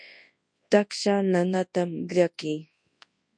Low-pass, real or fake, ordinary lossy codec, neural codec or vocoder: 9.9 kHz; fake; MP3, 48 kbps; codec, 24 kHz, 0.9 kbps, WavTokenizer, large speech release